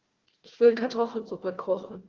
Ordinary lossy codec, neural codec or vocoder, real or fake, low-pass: Opus, 16 kbps; codec, 16 kHz, 1 kbps, FunCodec, trained on Chinese and English, 50 frames a second; fake; 7.2 kHz